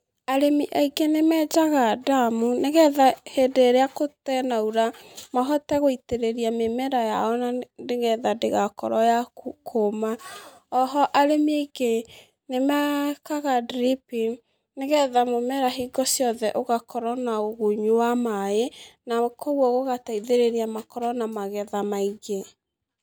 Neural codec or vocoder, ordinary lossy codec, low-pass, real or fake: none; none; none; real